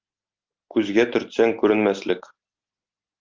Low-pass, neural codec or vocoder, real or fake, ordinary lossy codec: 7.2 kHz; none; real; Opus, 24 kbps